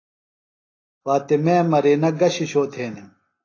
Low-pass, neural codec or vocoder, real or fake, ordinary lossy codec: 7.2 kHz; none; real; AAC, 32 kbps